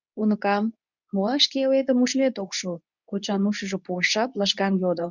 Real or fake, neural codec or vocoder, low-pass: fake; codec, 24 kHz, 0.9 kbps, WavTokenizer, medium speech release version 2; 7.2 kHz